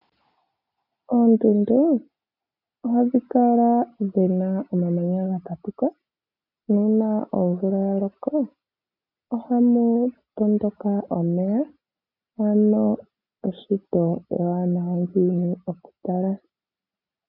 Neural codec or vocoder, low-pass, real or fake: none; 5.4 kHz; real